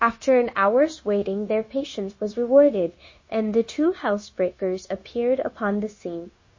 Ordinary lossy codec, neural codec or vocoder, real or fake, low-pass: MP3, 32 kbps; codec, 16 kHz, 0.9 kbps, LongCat-Audio-Codec; fake; 7.2 kHz